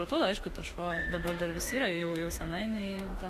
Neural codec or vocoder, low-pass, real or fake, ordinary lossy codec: autoencoder, 48 kHz, 32 numbers a frame, DAC-VAE, trained on Japanese speech; 14.4 kHz; fake; AAC, 48 kbps